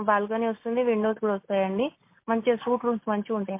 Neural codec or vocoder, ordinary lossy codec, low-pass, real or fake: none; MP3, 24 kbps; 3.6 kHz; real